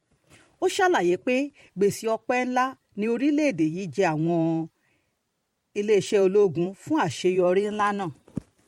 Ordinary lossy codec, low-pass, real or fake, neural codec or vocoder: MP3, 64 kbps; 19.8 kHz; fake; vocoder, 44.1 kHz, 128 mel bands every 512 samples, BigVGAN v2